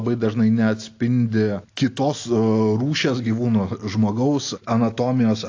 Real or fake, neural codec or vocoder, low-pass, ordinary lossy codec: real; none; 7.2 kHz; AAC, 48 kbps